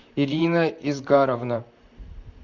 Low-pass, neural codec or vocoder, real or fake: 7.2 kHz; vocoder, 44.1 kHz, 128 mel bands, Pupu-Vocoder; fake